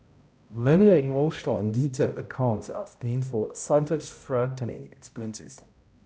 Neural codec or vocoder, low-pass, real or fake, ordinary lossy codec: codec, 16 kHz, 0.5 kbps, X-Codec, HuBERT features, trained on balanced general audio; none; fake; none